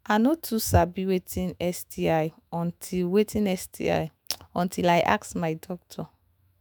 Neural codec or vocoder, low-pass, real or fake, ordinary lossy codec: autoencoder, 48 kHz, 128 numbers a frame, DAC-VAE, trained on Japanese speech; none; fake; none